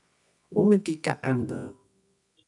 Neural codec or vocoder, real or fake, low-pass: codec, 24 kHz, 0.9 kbps, WavTokenizer, medium music audio release; fake; 10.8 kHz